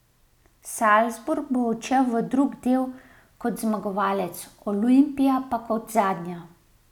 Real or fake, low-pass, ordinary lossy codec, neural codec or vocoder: real; 19.8 kHz; none; none